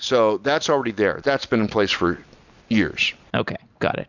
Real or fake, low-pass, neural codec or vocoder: real; 7.2 kHz; none